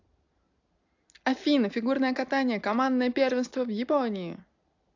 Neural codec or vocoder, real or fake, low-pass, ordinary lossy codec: none; real; 7.2 kHz; AAC, 48 kbps